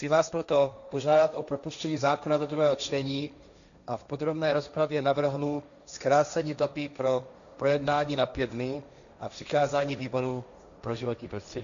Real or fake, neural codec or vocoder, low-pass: fake; codec, 16 kHz, 1.1 kbps, Voila-Tokenizer; 7.2 kHz